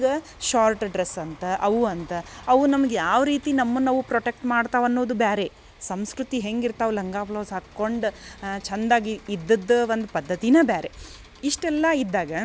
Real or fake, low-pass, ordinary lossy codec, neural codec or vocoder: real; none; none; none